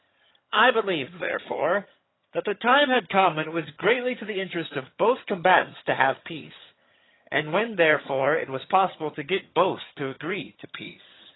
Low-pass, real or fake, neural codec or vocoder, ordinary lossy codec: 7.2 kHz; fake; vocoder, 22.05 kHz, 80 mel bands, HiFi-GAN; AAC, 16 kbps